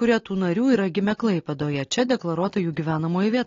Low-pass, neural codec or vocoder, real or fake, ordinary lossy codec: 7.2 kHz; none; real; AAC, 32 kbps